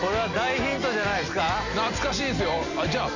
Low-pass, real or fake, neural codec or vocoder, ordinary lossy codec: 7.2 kHz; real; none; none